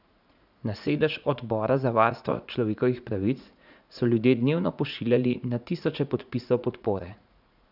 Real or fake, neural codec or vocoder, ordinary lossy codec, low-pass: fake; vocoder, 44.1 kHz, 80 mel bands, Vocos; none; 5.4 kHz